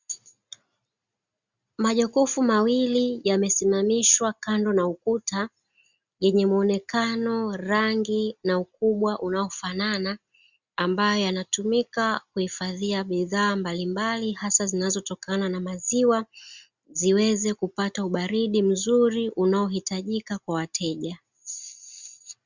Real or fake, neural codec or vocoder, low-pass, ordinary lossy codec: real; none; 7.2 kHz; Opus, 64 kbps